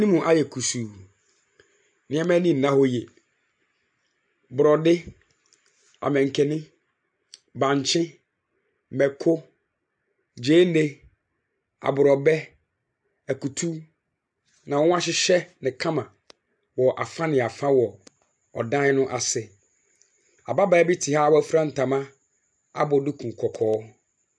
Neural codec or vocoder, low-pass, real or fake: none; 9.9 kHz; real